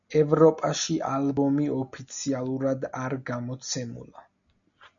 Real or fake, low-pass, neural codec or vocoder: real; 7.2 kHz; none